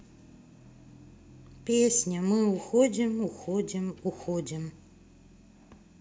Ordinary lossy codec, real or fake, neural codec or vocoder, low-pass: none; real; none; none